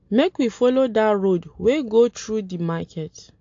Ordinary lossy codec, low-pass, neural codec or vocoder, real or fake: AAC, 48 kbps; 7.2 kHz; none; real